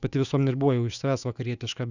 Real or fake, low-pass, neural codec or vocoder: fake; 7.2 kHz; autoencoder, 48 kHz, 128 numbers a frame, DAC-VAE, trained on Japanese speech